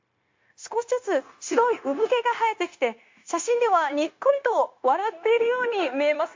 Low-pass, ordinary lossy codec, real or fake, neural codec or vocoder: 7.2 kHz; MP3, 48 kbps; fake; codec, 16 kHz, 0.9 kbps, LongCat-Audio-Codec